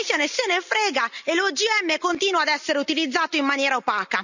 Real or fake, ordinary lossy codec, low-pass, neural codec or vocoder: real; none; 7.2 kHz; none